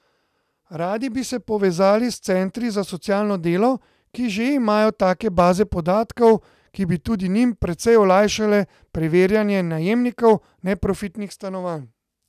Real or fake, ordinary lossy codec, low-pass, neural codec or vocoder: real; none; 14.4 kHz; none